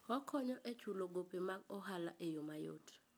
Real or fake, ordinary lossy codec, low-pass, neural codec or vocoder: real; none; none; none